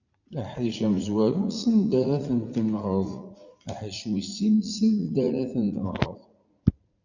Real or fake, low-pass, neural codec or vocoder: fake; 7.2 kHz; vocoder, 44.1 kHz, 80 mel bands, Vocos